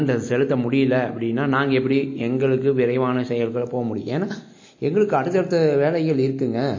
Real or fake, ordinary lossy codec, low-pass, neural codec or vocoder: real; MP3, 32 kbps; 7.2 kHz; none